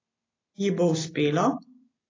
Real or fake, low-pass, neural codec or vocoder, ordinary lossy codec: real; 7.2 kHz; none; AAC, 32 kbps